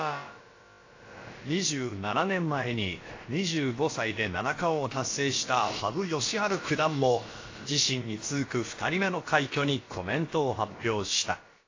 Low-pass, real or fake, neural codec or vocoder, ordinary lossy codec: 7.2 kHz; fake; codec, 16 kHz, about 1 kbps, DyCAST, with the encoder's durations; AAC, 32 kbps